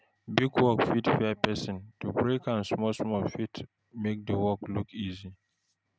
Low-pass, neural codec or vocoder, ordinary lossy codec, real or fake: none; none; none; real